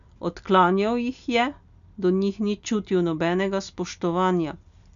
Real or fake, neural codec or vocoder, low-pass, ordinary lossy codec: real; none; 7.2 kHz; none